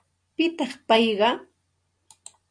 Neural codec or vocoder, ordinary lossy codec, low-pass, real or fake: none; AAC, 48 kbps; 9.9 kHz; real